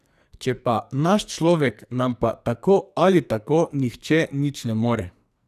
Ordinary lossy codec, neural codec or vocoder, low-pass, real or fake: none; codec, 44.1 kHz, 2.6 kbps, SNAC; 14.4 kHz; fake